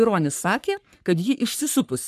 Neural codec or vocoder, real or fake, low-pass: codec, 44.1 kHz, 3.4 kbps, Pupu-Codec; fake; 14.4 kHz